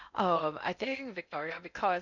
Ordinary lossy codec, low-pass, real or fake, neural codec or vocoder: none; 7.2 kHz; fake; codec, 16 kHz in and 24 kHz out, 0.6 kbps, FocalCodec, streaming, 4096 codes